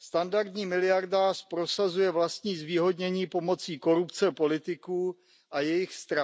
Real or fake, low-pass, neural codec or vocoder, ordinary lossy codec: real; none; none; none